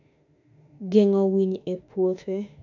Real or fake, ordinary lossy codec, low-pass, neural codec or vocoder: fake; none; 7.2 kHz; autoencoder, 48 kHz, 32 numbers a frame, DAC-VAE, trained on Japanese speech